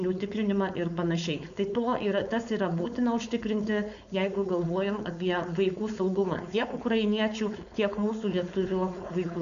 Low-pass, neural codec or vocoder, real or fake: 7.2 kHz; codec, 16 kHz, 4.8 kbps, FACodec; fake